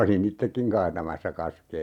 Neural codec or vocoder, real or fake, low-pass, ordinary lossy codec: none; real; 19.8 kHz; none